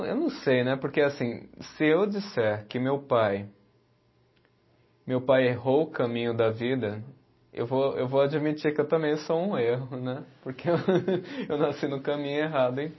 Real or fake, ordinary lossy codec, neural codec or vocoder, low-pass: real; MP3, 24 kbps; none; 7.2 kHz